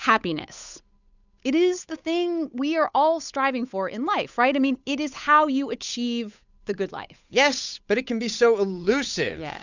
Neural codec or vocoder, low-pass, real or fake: codec, 16 kHz, 8 kbps, FunCodec, trained on Chinese and English, 25 frames a second; 7.2 kHz; fake